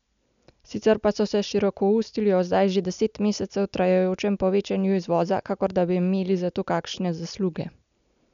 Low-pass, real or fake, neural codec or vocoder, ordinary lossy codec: 7.2 kHz; real; none; MP3, 96 kbps